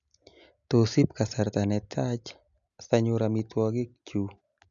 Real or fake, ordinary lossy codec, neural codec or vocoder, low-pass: real; none; none; 7.2 kHz